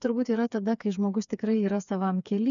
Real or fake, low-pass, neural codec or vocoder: fake; 7.2 kHz; codec, 16 kHz, 4 kbps, FreqCodec, smaller model